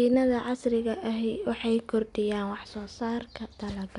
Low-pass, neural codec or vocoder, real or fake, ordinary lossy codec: 10.8 kHz; none; real; MP3, 96 kbps